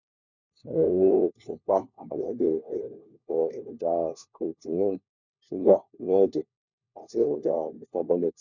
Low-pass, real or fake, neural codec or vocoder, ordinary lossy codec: 7.2 kHz; fake; codec, 16 kHz, 1 kbps, FunCodec, trained on LibriTTS, 50 frames a second; none